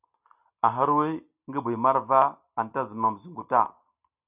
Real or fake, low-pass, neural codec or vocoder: real; 3.6 kHz; none